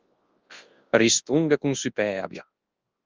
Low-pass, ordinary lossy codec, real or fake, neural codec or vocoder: 7.2 kHz; Opus, 32 kbps; fake; codec, 24 kHz, 0.9 kbps, WavTokenizer, large speech release